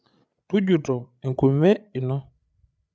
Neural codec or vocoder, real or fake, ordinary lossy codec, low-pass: codec, 16 kHz, 8 kbps, FreqCodec, larger model; fake; none; none